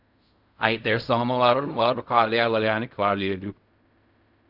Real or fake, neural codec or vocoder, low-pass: fake; codec, 16 kHz in and 24 kHz out, 0.4 kbps, LongCat-Audio-Codec, fine tuned four codebook decoder; 5.4 kHz